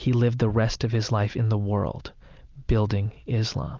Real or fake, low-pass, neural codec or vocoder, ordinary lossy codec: real; 7.2 kHz; none; Opus, 32 kbps